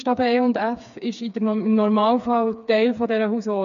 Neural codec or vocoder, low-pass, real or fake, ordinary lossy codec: codec, 16 kHz, 4 kbps, FreqCodec, smaller model; 7.2 kHz; fake; none